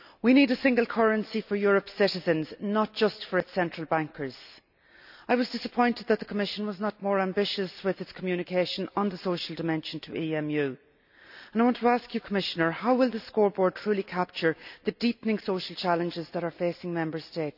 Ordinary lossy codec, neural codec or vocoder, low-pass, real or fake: none; none; 5.4 kHz; real